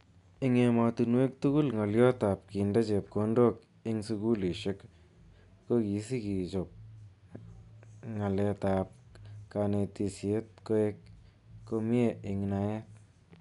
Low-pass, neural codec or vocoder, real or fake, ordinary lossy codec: 10.8 kHz; none; real; none